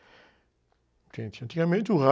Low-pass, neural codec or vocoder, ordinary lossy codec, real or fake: none; none; none; real